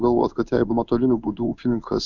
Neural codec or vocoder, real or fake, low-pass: codec, 16 kHz in and 24 kHz out, 1 kbps, XY-Tokenizer; fake; 7.2 kHz